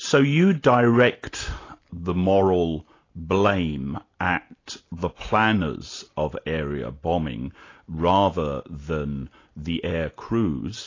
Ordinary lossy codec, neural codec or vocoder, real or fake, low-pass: AAC, 32 kbps; none; real; 7.2 kHz